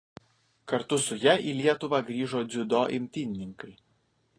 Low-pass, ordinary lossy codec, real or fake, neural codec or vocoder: 9.9 kHz; AAC, 32 kbps; real; none